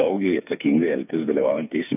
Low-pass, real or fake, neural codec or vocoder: 3.6 kHz; fake; codec, 16 kHz, 4 kbps, FreqCodec, smaller model